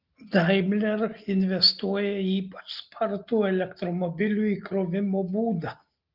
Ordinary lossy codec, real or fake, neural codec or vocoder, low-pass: Opus, 32 kbps; real; none; 5.4 kHz